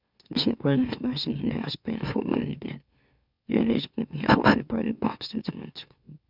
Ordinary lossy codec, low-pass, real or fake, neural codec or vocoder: none; 5.4 kHz; fake; autoencoder, 44.1 kHz, a latent of 192 numbers a frame, MeloTTS